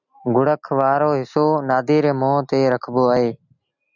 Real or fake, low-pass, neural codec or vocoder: real; 7.2 kHz; none